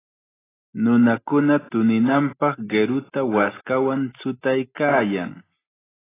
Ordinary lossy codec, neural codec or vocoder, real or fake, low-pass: AAC, 16 kbps; none; real; 3.6 kHz